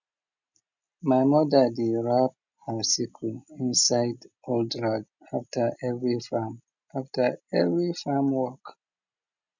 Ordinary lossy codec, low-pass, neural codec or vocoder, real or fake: none; 7.2 kHz; none; real